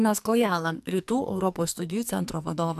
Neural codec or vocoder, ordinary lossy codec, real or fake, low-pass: codec, 44.1 kHz, 2.6 kbps, SNAC; AAC, 96 kbps; fake; 14.4 kHz